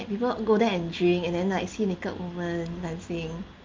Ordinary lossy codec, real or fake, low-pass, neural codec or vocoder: Opus, 32 kbps; real; 7.2 kHz; none